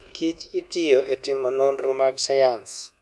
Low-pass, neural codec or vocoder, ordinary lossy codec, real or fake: none; codec, 24 kHz, 1.2 kbps, DualCodec; none; fake